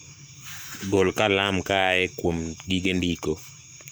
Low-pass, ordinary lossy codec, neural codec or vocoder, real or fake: none; none; codec, 44.1 kHz, 7.8 kbps, Pupu-Codec; fake